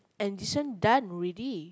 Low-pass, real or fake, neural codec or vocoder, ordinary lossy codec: none; real; none; none